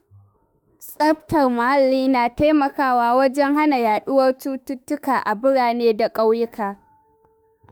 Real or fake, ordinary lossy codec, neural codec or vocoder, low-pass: fake; none; autoencoder, 48 kHz, 32 numbers a frame, DAC-VAE, trained on Japanese speech; none